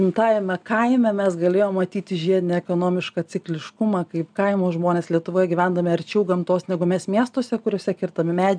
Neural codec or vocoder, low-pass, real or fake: none; 9.9 kHz; real